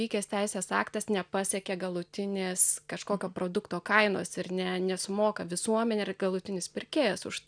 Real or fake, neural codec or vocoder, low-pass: real; none; 9.9 kHz